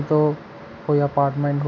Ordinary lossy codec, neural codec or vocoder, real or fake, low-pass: none; none; real; 7.2 kHz